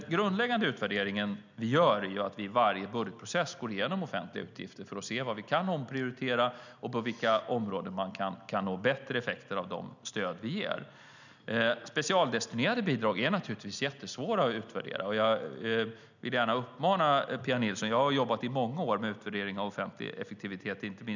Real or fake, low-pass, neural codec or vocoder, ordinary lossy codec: real; 7.2 kHz; none; none